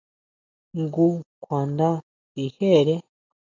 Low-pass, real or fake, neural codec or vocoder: 7.2 kHz; real; none